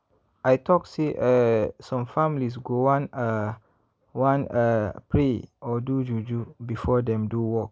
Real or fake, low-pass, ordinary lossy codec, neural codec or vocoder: real; none; none; none